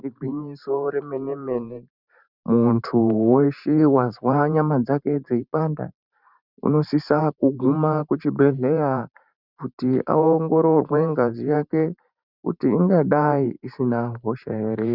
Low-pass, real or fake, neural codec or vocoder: 5.4 kHz; fake; vocoder, 44.1 kHz, 128 mel bands every 512 samples, BigVGAN v2